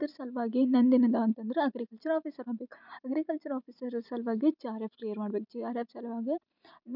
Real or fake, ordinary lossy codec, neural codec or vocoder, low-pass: real; none; none; 5.4 kHz